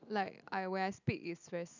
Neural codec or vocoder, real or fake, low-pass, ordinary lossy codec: none; real; 7.2 kHz; none